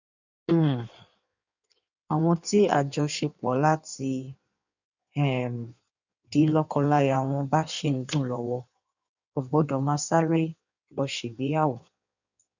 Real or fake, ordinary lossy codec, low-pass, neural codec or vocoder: fake; none; 7.2 kHz; codec, 16 kHz in and 24 kHz out, 1.1 kbps, FireRedTTS-2 codec